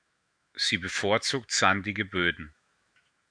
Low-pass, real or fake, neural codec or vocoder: 9.9 kHz; fake; autoencoder, 48 kHz, 128 numbers a frame, DAC-VAE, trained on Japanese speech